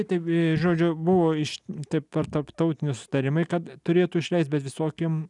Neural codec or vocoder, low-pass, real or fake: none; 10.8 kHz; real